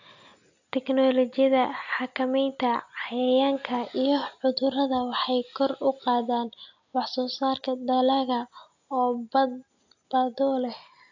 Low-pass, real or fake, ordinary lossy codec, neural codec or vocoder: 7.2 kHz; real; AAC, 48 kbps; none